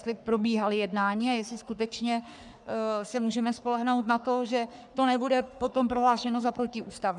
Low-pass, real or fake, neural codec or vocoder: 10.8 kHz; fake; codec, 44.1 kHz, 3.4 kbps, Pupu-Codec